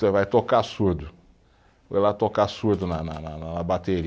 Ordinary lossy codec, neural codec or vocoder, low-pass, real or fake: none; none; none; real